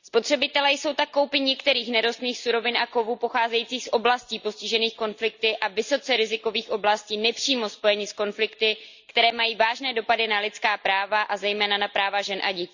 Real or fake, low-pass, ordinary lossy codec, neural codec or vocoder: real; 7.2 kHz; Opus, 64 kbps; none